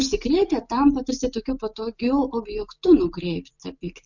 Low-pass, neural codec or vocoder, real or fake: 7.2 kHz; none; real